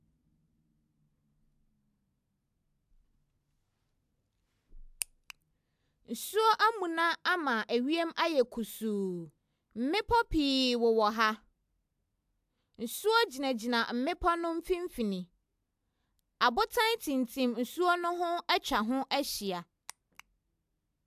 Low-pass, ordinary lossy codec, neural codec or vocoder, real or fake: 14.4 kHz; AAC, 96 kbps; none; real